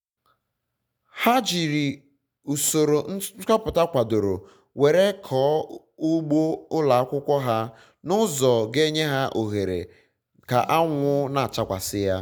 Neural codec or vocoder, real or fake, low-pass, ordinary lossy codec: none; real; none; none